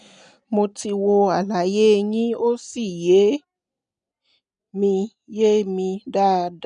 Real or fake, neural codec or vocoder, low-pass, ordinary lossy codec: real; none; 9.9 kHz; none